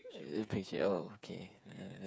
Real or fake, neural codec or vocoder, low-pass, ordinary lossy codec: fake; codec, 16 kHz, 8 kbps, FreqCodec, smaller model; none; none